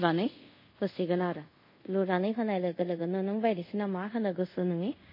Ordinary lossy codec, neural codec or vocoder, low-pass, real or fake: MP3, 24 kbps; codec, 24 kHz, 0.5 kbps, DualCodec; 5.4 kHz; fake